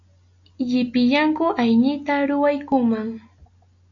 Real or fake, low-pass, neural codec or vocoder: real; 7.2 kHz; none